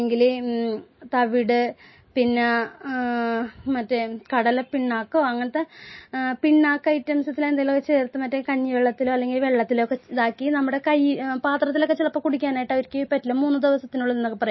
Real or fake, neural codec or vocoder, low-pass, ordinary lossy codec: real; none; 7.2 kHz; MP3, 24 kbps